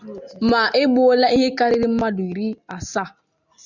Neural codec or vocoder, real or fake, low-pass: none; real; 7.2 kHz